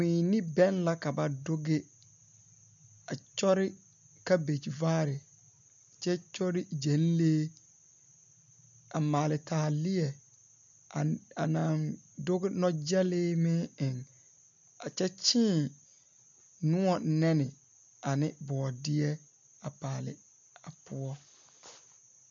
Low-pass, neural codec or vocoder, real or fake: 7.2 kHz; none; real